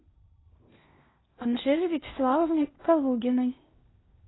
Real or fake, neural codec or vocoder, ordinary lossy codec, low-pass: fake; codec, 16 kHz in and 24 kHz out, 0.8 kbps, FocalCodec, streaming, 65536 codes; AAC, 16 kbps; 7.2 kHz